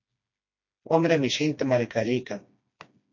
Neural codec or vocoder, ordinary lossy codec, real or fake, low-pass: codec, 16 kHz, 2 kbps, FreqCodec, smaller model; MP3, 48 kbps; fake; 7.2 kHz